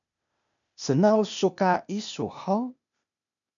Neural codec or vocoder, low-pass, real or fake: codec, 16 kHz, 0.8 kbps, ZipCodec; 7.2 kHz; fake